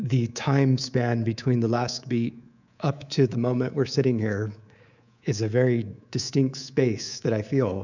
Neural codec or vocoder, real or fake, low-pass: codec, 24 kHz, 3.1 kbps, DualCodec; fake; 7.2 kHz